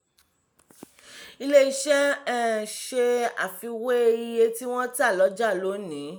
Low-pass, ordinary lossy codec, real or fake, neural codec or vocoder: none; none; real; none